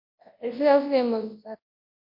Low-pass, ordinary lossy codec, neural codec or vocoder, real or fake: 5.4 kHz; MP3, 32 kbps; codec, 24 kHz, 0.9 kbps, WavTokenizer, large speech release; fake